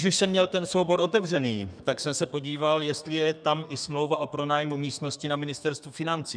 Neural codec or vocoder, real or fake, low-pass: codec, 32 kHz, 1.9 kbps, SNAC; fake; 9.9 kHz